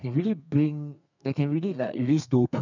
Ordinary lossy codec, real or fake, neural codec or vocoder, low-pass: none; fake; codec, 32 kHz, 1.9 kbps, SNAC; 7.2 kHz